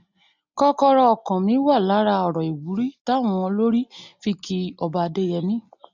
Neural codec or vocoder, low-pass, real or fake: none; 7.2 kHz; real